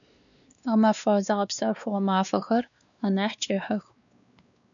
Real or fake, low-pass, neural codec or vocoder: fake; 7.2 kHz; codec, 16 kHz, 2 kbps, X-Codec, WavLM features, trained on Multilingual LibriSpeech